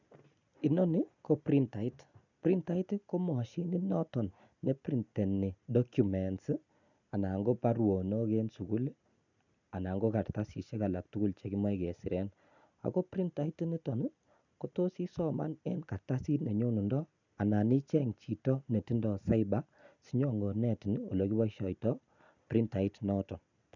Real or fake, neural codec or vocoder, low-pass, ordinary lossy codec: real; none; 7.2 kHz; none